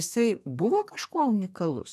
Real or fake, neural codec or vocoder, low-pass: fake; codec, 32 kHz, 1.9 kbps, SNAC; 14.4 kHz